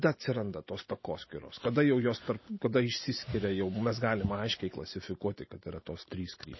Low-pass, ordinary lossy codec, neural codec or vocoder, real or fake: 7.2 kHz; MP3, 24 kbps; none; real